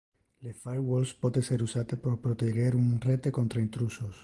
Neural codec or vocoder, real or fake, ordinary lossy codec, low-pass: none; real; Opus, 24 kbps; 10.8 kHz